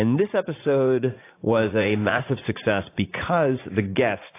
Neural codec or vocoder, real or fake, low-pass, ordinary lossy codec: vocoder, 44.1 kHz, 80 mel bands, Vocos; fake; 3.6 kHz; AAC, 24 kbps